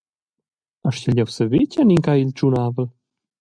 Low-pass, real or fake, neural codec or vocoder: 9.9 kHz; real; none